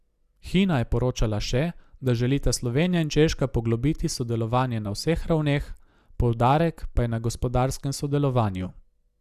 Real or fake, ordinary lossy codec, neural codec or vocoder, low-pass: real; Opus, 64 kbps; none; 14.4 kHz